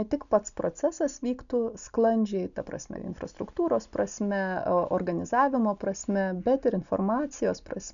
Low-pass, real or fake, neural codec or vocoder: 7.2 kHz; real; none